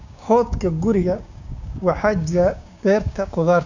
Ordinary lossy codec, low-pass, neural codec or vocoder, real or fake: none; 7.2 kHz; codec, 16 kHz, 6 kbps, DAC; fake